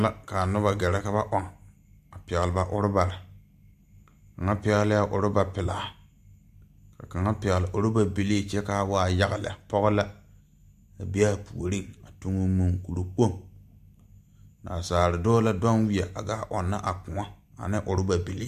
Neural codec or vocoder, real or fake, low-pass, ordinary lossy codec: none; real; 14.4 kHz; AAC, 96 kbps